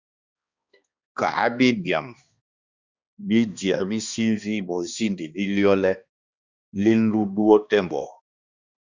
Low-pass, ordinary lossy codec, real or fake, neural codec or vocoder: 7.2 kHz; Opus, 64 kbps; fake; codec, 16 kHz, 2 kbps, X-Codec, HuBERT features, trained on balanced general audio